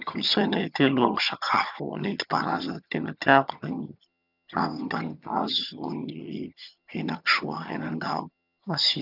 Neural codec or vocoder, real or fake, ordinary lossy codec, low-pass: vocoder, 22.05 kHz, 80 mel bands, HiFi-GAN; fake; none; 5.4 kHz